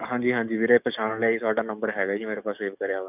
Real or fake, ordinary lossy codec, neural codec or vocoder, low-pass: fake; none; codec, 44.1 kHz, 7.8 kbps, DAC; 3.6 kHz